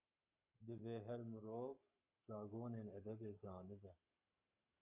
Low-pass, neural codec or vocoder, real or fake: 3.6 kHz; codec, 44.1 kHz, 7.8 kbps, Pupu-Codec; fake